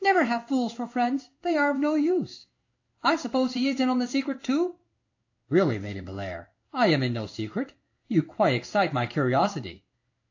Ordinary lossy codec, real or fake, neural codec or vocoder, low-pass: AAC, 48 kbps; fake; codec, 16 kHz in and 24 kHz out, 1 kbps, XY-Tokenizer; 7.2 kHz